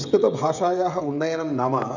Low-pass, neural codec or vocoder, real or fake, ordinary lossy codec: 7.2 kHz; codec, 16 kHz, 16 kbps, FreqCodec, smaller model; fake; none